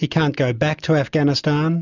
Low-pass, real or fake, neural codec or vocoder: 7.2 kHz; real; none